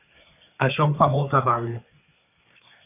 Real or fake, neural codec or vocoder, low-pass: fake; codec, 24 kHz, 1 kbps, SNAC; 3.6 kHz